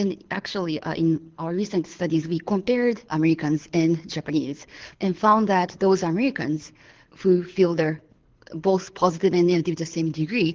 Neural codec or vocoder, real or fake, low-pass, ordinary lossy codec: codec, 24 kHz, 6 kbps, HILCodec; fake; 7.2 kHz; Opus, 16 kbps